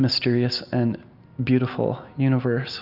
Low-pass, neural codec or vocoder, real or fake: 5.4 kHz; none; real